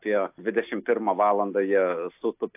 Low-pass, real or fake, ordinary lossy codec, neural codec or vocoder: 3.6 kHz; real; AAC, 32 kbps; none